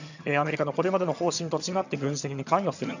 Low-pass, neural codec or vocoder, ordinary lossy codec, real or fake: 7.2 kHz; vocoder, 22.05 kHz, 80 mel bands, HiFi-GAN; none; fake